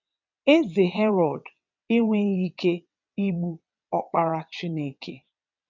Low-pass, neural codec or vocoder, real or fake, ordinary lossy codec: 7.2 kHz; vocoder, 22.05 kHz, 80 mel bands, WaveNeXt; fake; none